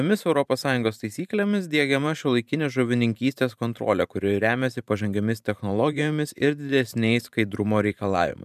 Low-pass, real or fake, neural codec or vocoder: 14.4 kHz; fake; vocoder, 44.1 kHz, 128 mel bands every 512 samples, BigVGAN v2